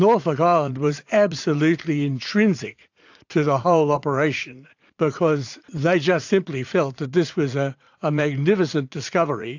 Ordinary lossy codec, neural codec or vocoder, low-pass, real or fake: AAC, 48 kbps; vocoder, 44.1 kHz, 80 mel bands, Vocos; 7.2 kHz; fake